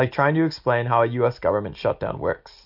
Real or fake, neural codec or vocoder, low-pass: real; none; 5.4 kHz